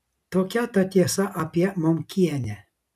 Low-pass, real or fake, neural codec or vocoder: 14.4 kHz; real; none